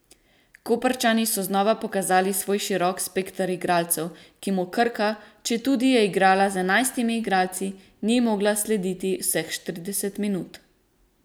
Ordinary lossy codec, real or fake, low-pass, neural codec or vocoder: none; real; none; none